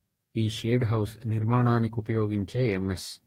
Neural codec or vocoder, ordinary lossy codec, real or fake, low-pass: codec, 44.1 kHz, 2.6 kbps, DAC; AAC, 48 kbps; fake; 19.8 kHz